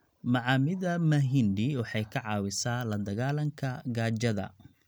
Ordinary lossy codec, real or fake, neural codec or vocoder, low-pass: none; real; none; none